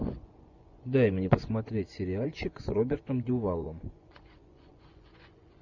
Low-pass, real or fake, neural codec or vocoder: 7.2 kHz; fake; vocoder, 24 kHz, 100 mel bands, Vocos